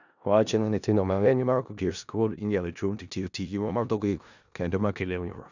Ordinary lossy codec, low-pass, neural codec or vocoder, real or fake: none; 7.2 kHz; codec, 16 kHz in and 24 kHz out, 0.4 kbps, LongCat-Audio-Codec, four codebook decoder; fake